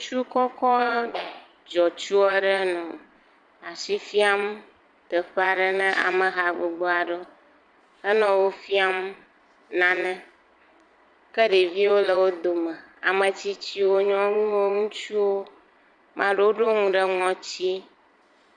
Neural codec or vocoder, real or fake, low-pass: vocoder, 22.05 kHz, 80 mel bands, Vocos; fake; 9.9 kHz